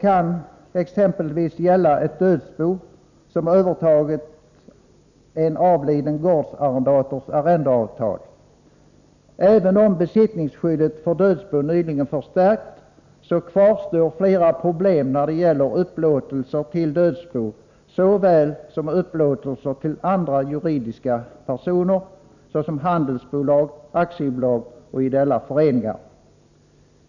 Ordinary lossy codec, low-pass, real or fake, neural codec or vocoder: none; 7.2 kHz; real; none